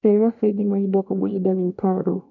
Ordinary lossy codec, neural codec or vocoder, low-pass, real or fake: none; codec, 24 kHz, 0.9 kbps, WavTokenizer, small release; 7.2 kHz; fake